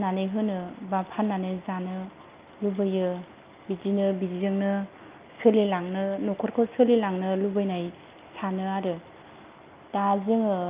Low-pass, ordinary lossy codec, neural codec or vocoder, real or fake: 3.6 kHz; Opus, 24 kbps; none; real